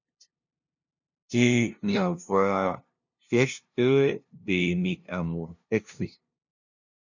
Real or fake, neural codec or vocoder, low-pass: fake; codec, 16 kHz, 0.5 kbps, FunCodec, trained on LibriTTS, 25 frames a second; 7.2 kHz